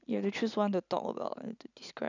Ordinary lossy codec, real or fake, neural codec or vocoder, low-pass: none; real; none; 7.2 kHz